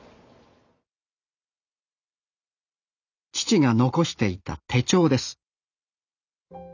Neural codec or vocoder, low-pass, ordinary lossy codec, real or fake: none; 7.2 kHz; none; real